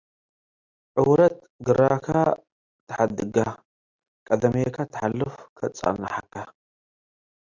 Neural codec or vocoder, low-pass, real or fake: none; 7.2 kHz; real